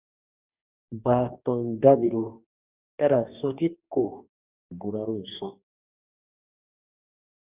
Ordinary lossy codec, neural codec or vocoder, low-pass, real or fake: Opus, 64 kbps; codec, 44.1 kHz, 2.6 kbps, DAC; 3.6 kHz; fake